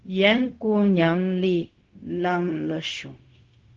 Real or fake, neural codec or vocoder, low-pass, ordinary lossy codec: fake; codec, 16 kHz, 0.4 kbps, LongCat-Audio-Codec; 7.2 kHz; Opus, 16 kbps